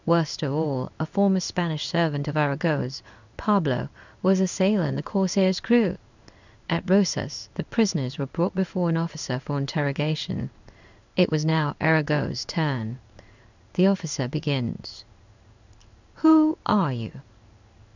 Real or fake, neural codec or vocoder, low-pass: fake; codec, 16 kHz in and 24 kHz out, 1 kbps, XY-Tokenizer; 7.2 kHz